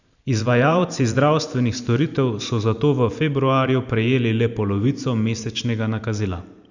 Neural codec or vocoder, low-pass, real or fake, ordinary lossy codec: vocoder, 44.1 kHz, 128 mel bands every 512 samples, BigVGAN v2; 7.2 kHz; fake; none